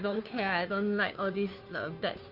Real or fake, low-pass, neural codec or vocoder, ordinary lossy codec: fake; 5.4 kHz; codec, 16 kHz, 2 kbps, FunCodec, trained on Chinese and English, 25 frames a second; none